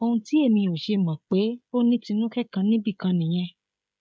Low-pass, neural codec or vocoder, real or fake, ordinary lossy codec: none; codec, 16 kHz, 16 kbps, FreqCodec, smaller model; fake; none